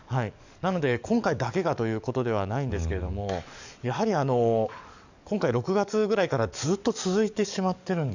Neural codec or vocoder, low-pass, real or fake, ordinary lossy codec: codec, 44.1 kHz, 7.8 kbps, DAC; 7.2 kHz; fake; none